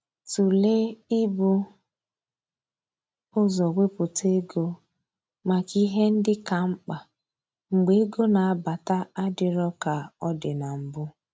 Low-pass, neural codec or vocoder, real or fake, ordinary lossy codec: none; none; real; none